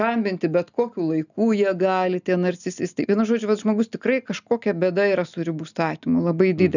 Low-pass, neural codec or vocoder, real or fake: 7.2 kHz; none; real